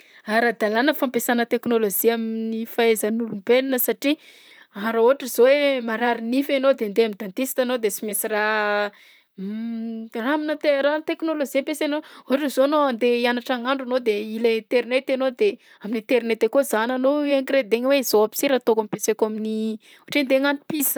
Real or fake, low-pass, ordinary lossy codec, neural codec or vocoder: fake; none; none; vocoder, 44.1 kHz, 128 mel bands, Pupu-Vocoder